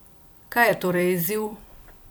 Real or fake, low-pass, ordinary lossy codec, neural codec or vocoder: fake; none; none; vocoder, 44.1 kHz, 128 mel bands every 256 samples, BigVGAN v2